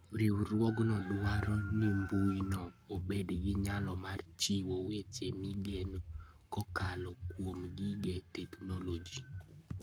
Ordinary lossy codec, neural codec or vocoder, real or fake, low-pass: none; codec, 44.1 kHz, 7.8 kbps, Pupu-Codec; fake; none